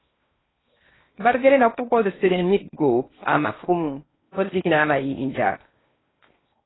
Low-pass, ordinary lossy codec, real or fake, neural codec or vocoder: 7.2 kHz; AAC, 16 kbps; fake; codec, 16 kHz in and 24 kHz out, 0.8 kbps, FocalCodec, streaming, 65536 codes